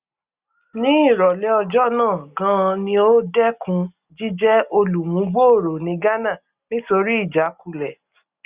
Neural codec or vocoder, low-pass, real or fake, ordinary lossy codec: none; 3.6 kHz; real; Opus, 64 kbps